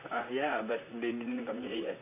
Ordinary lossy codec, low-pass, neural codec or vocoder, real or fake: none; 3.6 kHz; vocoder, 44.1 kHz, 128 mel bands, Pupu-Vocoder; fake